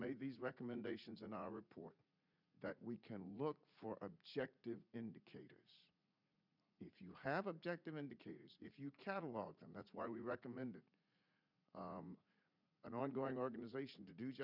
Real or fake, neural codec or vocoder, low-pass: fake; vocoder, 44.1 kHz, 80 mel bands, Vocos; 5.4 kHz